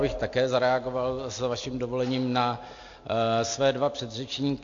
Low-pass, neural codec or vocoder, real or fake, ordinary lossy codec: 7.2 kHz; none; real; AAC, 48 kbps